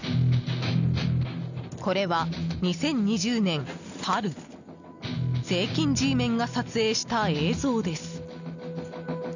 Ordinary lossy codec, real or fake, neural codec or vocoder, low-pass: none; real; none; 7.2 kHz